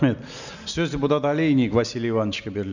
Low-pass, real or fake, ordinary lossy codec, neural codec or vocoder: 7.2 kHz; real; none; none